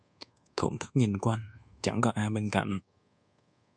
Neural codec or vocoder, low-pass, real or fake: codec, 24 kHz, 1.2 kbps, DualCodec; 9.9 kHz; fake